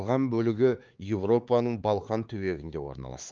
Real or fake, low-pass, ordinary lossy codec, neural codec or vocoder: fake; 7.2 kHz; Opus, 24 kbps; codec, 16 kHz, 4 kbps, X-Codec, HuBERT features, trained on LibriSpeech